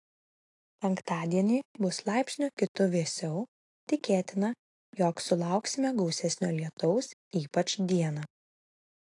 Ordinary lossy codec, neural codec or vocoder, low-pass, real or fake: AAC, 48 kbps; none; 10.8 kHz; real